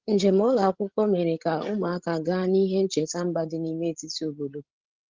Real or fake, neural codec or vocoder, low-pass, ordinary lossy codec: fake; codec, 16 kHz, 16 kbps, FreqCodec, larger model; 7.2 kHz; Opus, 16 kbps